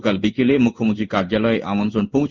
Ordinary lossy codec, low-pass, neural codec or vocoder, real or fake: Opus, 24 kbps; 7.2 kHz; codec, 16 kHz in and 24 kHz out, 1 kbps, XY-Tokenizer; fake